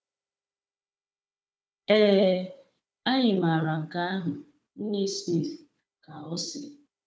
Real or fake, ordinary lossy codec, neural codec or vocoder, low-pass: fake; none; codec, 16 kHz, 4 kbps, FunCodec, trained on Chinese and English, 50 frames a second; none